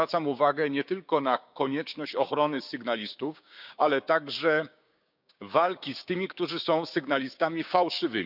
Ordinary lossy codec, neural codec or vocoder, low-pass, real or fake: none; codec, 16 kHz, 6 kbps, DAC; 5.4 kHz; fake